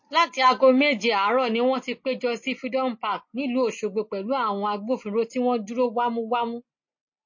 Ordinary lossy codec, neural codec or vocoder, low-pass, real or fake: MP3, 32 kbps; none; 7.2 kHz; real